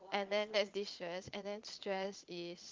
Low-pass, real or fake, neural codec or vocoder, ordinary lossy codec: 7.2 kHz; fake; vocoder, 22.05 kHz, 80 mel bands, Vocos; Opus, 32 kbps